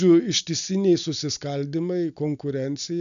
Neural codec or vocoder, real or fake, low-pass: none; real; 7.2 kHz